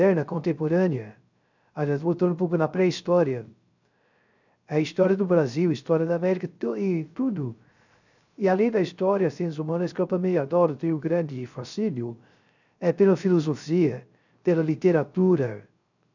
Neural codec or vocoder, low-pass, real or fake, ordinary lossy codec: codec, 16 kHz, 0.3 kbps, FocalCodec; 7.2 kHz; fake; none